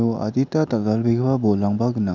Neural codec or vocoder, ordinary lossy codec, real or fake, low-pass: none; none; real; 7.2 kHz